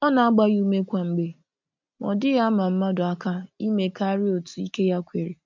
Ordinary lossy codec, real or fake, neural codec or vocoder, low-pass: MP3, 64 kbps; real; none; 7.2 kHz